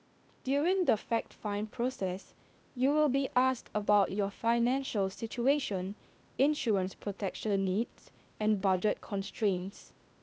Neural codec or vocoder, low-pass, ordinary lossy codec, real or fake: codec, 16 kHz, 0.8 kbps, ZipCodec; none; none; fake